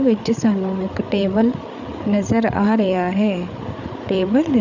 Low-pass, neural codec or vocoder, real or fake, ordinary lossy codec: 7.2 kHz; codec, 16 kHz, 8 kbps, FreqCodec, larger model; fake; none